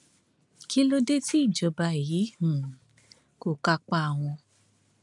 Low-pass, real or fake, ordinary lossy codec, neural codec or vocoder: 10.8 kHz; real; none; none